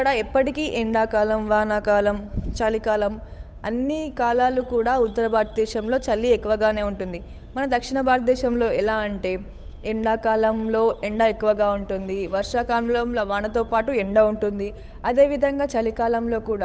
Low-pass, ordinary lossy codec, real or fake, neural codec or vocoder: none; none; fake; codec, 16 kHz, 8 kbps, FunCodec, trained on Chinese and English, 25 frames a second